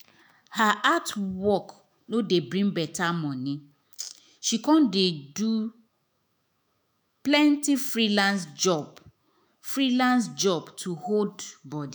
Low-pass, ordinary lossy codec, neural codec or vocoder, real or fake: none; none; autoencoder, 48 kHz, 128 numbers a frame, DAC-VAE, trained on Japanese speech; fake